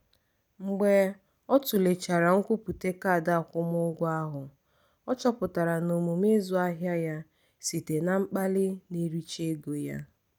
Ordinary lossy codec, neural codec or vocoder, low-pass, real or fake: none; none; none; real